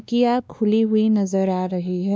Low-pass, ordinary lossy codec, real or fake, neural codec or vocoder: none; none; fake; codec, 16 kHz, 2 kbps, X-Codec, WavLM features, trained on Multilingual LibriSpeech